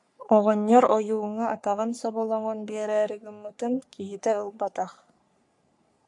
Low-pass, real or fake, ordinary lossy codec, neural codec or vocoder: 10.8 kHz; fake; AAC, 64 kbps; codec, 44.1 kHz, 3.4 kbps, Pupu-Codec